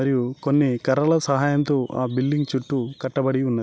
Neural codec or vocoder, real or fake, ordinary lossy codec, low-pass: none; real; none; none